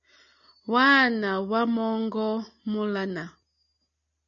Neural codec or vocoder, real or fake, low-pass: none; real; 7.2 kHz